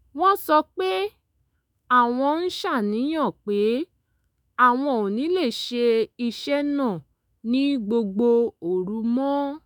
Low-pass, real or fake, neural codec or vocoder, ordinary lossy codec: none; fake; autoencoder, 48 kHz, 128 numbers a frame, DAC-VAE, trained on Japanese speech; none